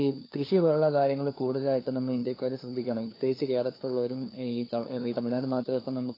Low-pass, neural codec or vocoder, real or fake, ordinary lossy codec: 5.4 kHz; codec, 16 kHz, 2 kbps, FunCodec, trained on LibriTTS, 25 frames a second; fake; AAC, 24 kbps